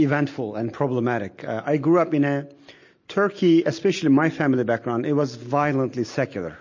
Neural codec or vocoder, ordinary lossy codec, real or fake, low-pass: none; MP3, 32 kbps; real; 7.2 kHz